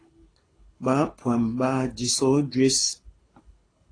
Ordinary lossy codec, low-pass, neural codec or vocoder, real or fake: AAC, 32 kbps; 9.9 kHz; codec, 24 kHz, 6 kbps, HILCodec; fake